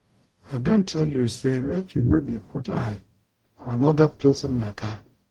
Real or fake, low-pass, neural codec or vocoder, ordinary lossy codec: fake; 19.8 kHz; codec, 44.1 kHz, 0.9 kbps, DAC; Opus, 24 kbps